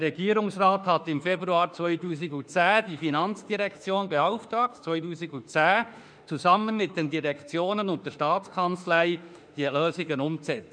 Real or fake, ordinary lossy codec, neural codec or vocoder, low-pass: fake; none; autoencoder, 48 kHz, 32 numbers a frame, DAC-VAE, trained on Japanese speech; 9.9 kHz